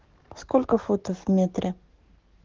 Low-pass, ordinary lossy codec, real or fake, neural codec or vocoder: 7.2 kHz; Opus, 16 kbps; fake; codec, 44.1 kHz, 7.8 kbps, DAC